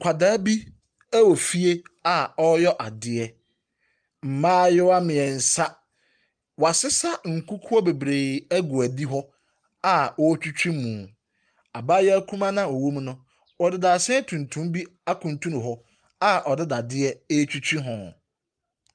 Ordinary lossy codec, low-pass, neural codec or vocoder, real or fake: Opus, 32 kbps; 9.9 kHz; none; real